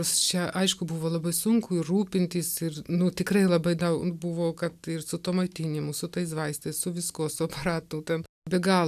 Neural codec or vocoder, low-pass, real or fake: none; 14.4 kHz; real